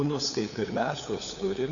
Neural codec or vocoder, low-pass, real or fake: codec, 16 kHz, 8 kbps, FunCodec, trained on LibriTTS, 25 frames a second; 7.2 kHz; fake